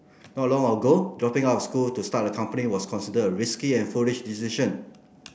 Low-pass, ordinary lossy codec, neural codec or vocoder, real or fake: none; none; none; real